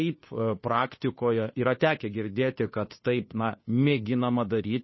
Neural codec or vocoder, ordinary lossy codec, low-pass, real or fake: codec, 16 kHz, 2 kbps, FunCodec, trained on Chinese and English, 25 frames a second; MP3, 24 kbps; 7.2 kHz; fake